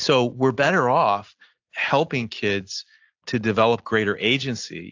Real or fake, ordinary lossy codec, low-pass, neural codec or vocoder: real; AAC, 48 kbps; 7.2 kHz; none